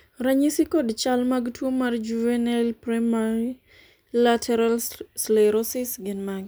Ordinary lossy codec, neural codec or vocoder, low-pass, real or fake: none; none; none; real